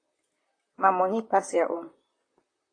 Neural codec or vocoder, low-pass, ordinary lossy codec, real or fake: vocoder, 44.1 kHz, 128 mel bands, Pupu-Vocoder; 9.9 kHz; AAC, 32 kbps; fake